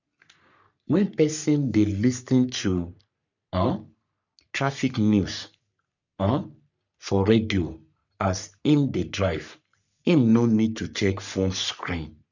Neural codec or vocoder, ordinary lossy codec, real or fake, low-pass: codec, 44.1 kHz, 3.4 kbps, Pupu-Codec; none; fake; 7.2 kHz